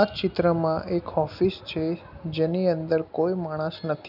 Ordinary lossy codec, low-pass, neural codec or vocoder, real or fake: none; 5.4 kHz; none; real